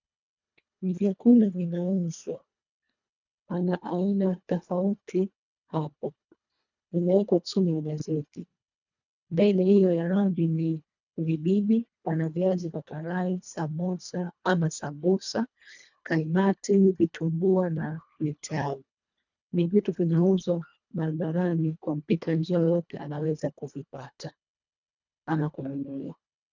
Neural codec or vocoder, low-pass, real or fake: codec, 24 kHz, 1.5 kbps, HILCodec; 7.2 kHz; fake